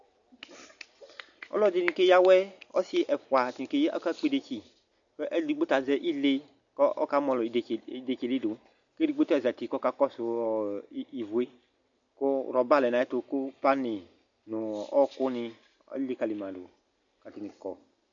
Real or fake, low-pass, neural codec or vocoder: real; 7.2 kHz; none